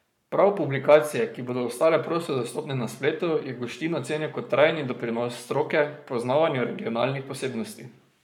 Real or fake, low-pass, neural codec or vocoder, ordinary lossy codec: fake; 19.8 kHz; codec, 44.1 kHz, 7.8 kbps, Pupu-Codec; none